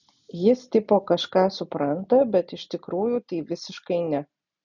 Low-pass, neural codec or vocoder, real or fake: 7.2 kHz; none; real